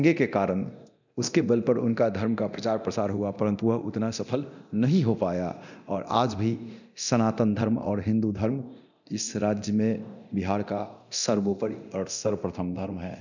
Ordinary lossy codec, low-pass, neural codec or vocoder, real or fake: none; 7.2 kHz; codec, 24 kHz, 0.9 kbps, DualCodec; fake